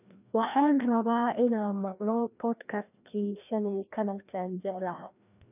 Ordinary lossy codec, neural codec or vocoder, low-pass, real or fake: none; codec, 16 kHz, 1 kbps, FreqCodec, larger model; 3.6 kHz; fake